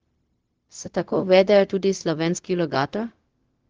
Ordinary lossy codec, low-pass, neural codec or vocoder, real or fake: Opus, 16 kbps; 7.2 kHz; codec, 16 kHz, 0.4 kbps, LongCat-Audio-Codec; fake